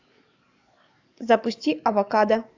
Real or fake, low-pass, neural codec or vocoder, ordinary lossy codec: fake; 7.2 kHz; codec, 44.1 kHz, 7.8 kbps, DAC; AAC, 48 kbps